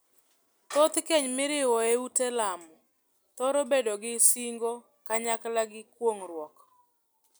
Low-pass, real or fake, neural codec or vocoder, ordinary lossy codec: none; real; none; none